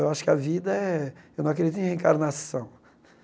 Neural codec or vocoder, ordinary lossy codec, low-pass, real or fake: none; none; none; real